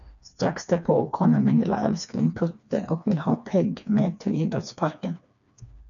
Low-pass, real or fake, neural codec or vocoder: 7.2 kHz; fake; codec, 16 kHz, 2 kbps, FreqCodec, smaller model